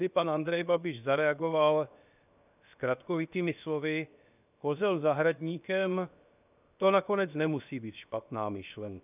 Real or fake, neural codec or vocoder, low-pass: fake; codec, 16 kHz, 0.7 kbps, FocalCodec; 3.6 kHz